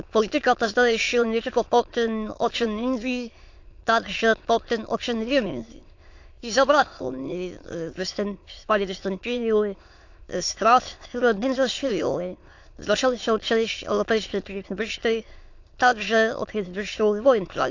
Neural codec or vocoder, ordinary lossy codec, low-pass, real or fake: autoencoder, 22.05 kHz, a latent of 192 numbers a frame, VITS, trained on many speakers; AAC, 48 kbps; 7.2 kHz; fake